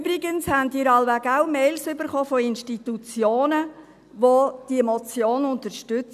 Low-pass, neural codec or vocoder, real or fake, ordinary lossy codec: 14.4 kHz; none; real; none